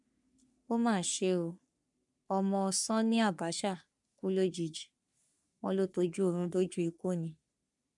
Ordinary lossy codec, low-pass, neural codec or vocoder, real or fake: none; 10.8 kHz; codec, 44.1 kHz, 3.4 kbps, Pupu-Codec; fake